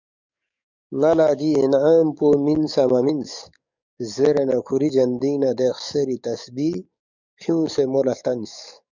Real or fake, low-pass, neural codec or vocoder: fake; 7.2 kHz; codec, 44.1 kHz, 7.8 kbps, DAC